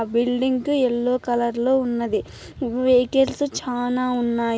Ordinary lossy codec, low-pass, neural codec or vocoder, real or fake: none; none; none; real